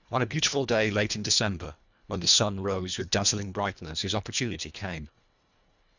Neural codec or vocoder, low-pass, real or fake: codec, 24 kHz, 1.5 kbps, HILCodec; 7.2 kHz; fake